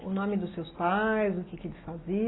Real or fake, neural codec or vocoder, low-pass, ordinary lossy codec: real; none; 7.2 kHz; AAC, 16 kbps